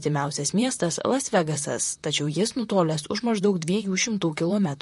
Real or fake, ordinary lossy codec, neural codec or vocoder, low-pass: fake; MP3, 48 kbps; vocoder, 44.1 kHz, 128 mel bands, Pupu-Vocoder; 14.4 kHz